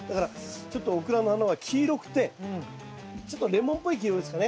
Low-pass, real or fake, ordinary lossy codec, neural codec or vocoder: none; real; none; none